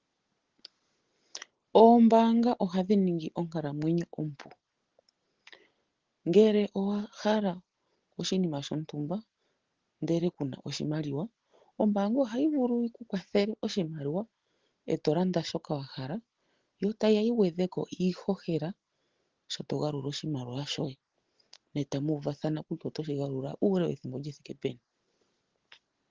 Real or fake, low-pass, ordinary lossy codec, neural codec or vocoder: real; 7.2 kHz; Opus, 16 kbps; none